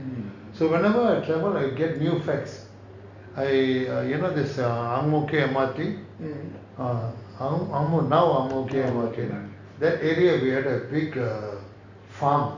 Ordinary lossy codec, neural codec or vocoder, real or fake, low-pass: Opus, 64 kbps; none; real; 7.2 kHz